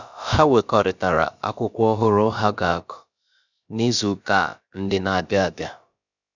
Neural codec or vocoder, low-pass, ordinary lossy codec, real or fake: codec, 16 kHz, about 1 kbps, DyCAST, with the encoder's durations; 7.2 kHz; none; fake